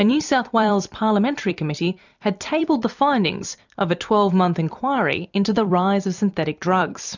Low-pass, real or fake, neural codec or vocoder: 7.2 kHz; fake; vocoder, 44.1 kHz, 128 mel bands every 256 samples, BigVGAN v2